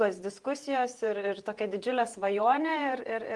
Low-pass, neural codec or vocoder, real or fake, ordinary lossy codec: 10.8 kHz; vocoder, 48 kHz, 128 mel bands, Vocos; fake; Opus, 24 kbps